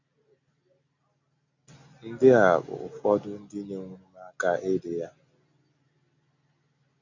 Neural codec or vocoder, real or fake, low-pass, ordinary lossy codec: none; real; 7.2 kHz; none